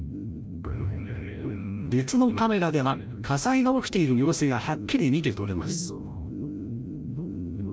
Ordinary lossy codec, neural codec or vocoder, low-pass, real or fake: none; codec, 16 kHz, 0.5 kbps, FreqCodec, larger model; none; fake